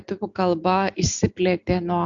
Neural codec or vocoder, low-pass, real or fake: none; 7.2 kHz; real